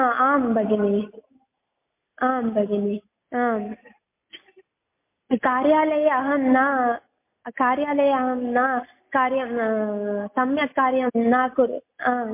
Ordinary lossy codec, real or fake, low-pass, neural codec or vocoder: MP3, 24 kbps; real; 3.6 kHz; none